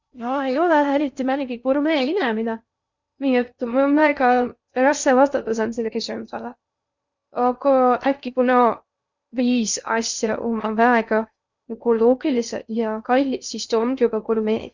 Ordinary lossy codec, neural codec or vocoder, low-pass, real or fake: Opus, 64 kbps; codec, 16 kHz in and 24 kHz out, 0.6 kbps, FocalCodec, streaming, 2048 codes; 7.2 kHz; fake